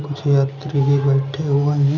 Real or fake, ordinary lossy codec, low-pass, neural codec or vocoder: real; none; 7.2 kHz; none